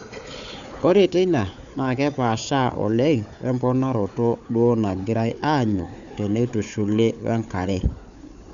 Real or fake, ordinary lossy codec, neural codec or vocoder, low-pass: fake; none; codec, 16 kHz, 4 kbps, FunCodec, trained on Chinese and English, 50 frames a second; 7.2 kHz